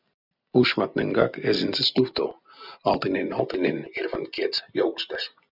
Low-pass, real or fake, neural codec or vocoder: 5.4 kHz; real; none